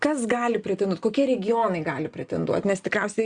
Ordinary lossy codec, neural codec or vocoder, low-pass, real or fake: Opus, 64 kbps; none; 9.9 kHz; real